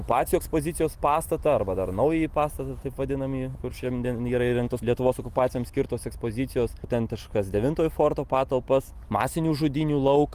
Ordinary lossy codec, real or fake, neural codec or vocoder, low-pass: Opus, 32 kbps; real; none; 14.4 kHz